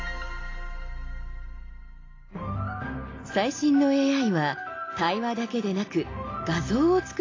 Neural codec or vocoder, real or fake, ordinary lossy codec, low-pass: none; real; AAC, 32 kbps; 7.2 kHz